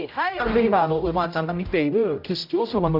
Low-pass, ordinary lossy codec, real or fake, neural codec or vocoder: 5.4 kHz; none; fake; codec, 16 kHz, 0.5 kbps, X-Codec, HuBERT features, trained on general audio